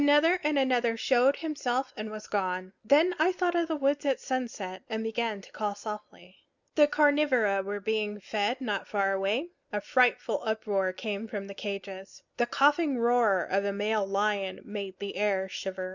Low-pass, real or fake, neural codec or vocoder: 7.2 kHz; real; none